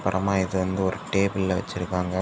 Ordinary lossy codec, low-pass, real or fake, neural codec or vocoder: none; none; real; none